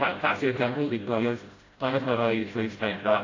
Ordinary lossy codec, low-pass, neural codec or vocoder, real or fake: AAC, 32 kbps; 7.2 kHz; codec, 16 kHz, 0.5 kbps, FreqCodec, smaller model; fake